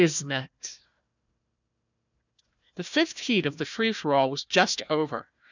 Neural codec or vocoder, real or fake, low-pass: codec, 16 kHz, 1 kbps, FunCodec, trained on Chinese and English, 50 frames a second; fake; 7.2 kHz